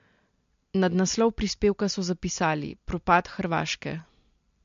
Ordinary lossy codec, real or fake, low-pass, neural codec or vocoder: MP3, 48 kbps; real; 7.2 kHz; none